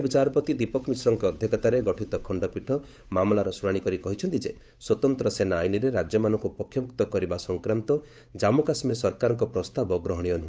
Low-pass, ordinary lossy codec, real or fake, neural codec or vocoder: none; none; fake; codec, 16 kHz, 8 kbps, FunCodec, trained on Chinese and English, 25 frames a second